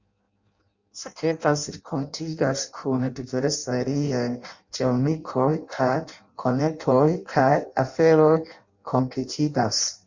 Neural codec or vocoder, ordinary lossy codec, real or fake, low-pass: codec, 16 kHz in and 24 kHz out, 0.6 kbps, FireRedTTS-2 codec; Opus, 64 kbps; fake; 7.2 kHz